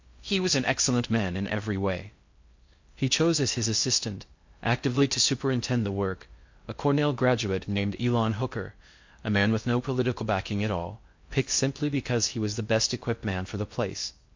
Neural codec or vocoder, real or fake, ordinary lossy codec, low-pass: codec, 16 kHz in and 24 kHz out, 0.6 kbps, FocalCodec, streaming, 4096 codes; fake; MP3, 48 kbps; 7.2 kHz